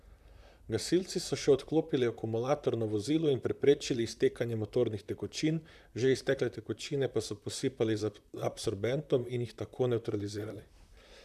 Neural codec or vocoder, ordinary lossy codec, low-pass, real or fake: vocoder, 44.1 kHz, 128 mel bands, Pupu-Vocoder; none; 14.4 kHz; fake